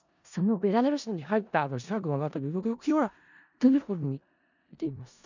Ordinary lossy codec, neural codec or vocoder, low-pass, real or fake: none; codec, 16 kHz in and 24 kHz out, 0.4 kbps, LongCat-Audio-Codec, four codebook decoder; 7.2 kHz; fake